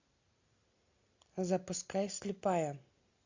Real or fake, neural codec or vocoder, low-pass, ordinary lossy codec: real; none; 7.2 kHz; AAC, 48 kbps